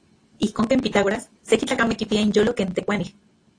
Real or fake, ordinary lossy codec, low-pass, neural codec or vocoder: real; AAC, 48 kbps; 9.9 kHz; none